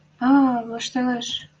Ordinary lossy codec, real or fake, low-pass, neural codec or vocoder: Opus, 24 kbps; real; 7.2 kHz; none